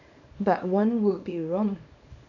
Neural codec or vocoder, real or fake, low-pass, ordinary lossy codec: codec, 24 kHz, 0.9 kbps, WavTokenizer, small release; fake; 7.2 kHz; none